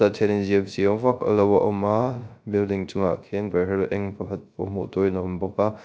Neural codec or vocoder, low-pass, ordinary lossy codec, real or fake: codec, 16 kHz, 0.3 kbps, FocalCodec; none; none; fake